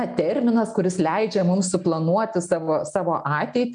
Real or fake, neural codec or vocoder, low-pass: real; none; 9.9 kHz